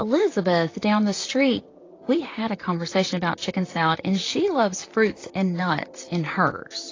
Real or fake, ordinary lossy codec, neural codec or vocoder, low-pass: fake; AAC, 32 kbps; vocoder, 44.1 kHz, 128 mel bands, Pupu-Vocoder; 7.2 kHz